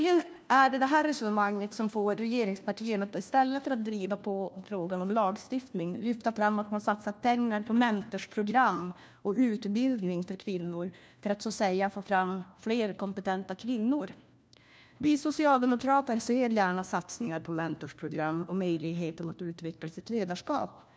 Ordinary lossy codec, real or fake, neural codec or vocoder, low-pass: none; fake; codec, 16 kHz, 1 kbps, FunCodec, trained on LibriTTS, 50 frames a second; none